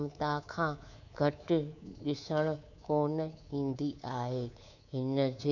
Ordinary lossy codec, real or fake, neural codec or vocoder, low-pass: none; fake; codec, 24 kHz, 3.1 kbps, DualCodec; 7.2 kHz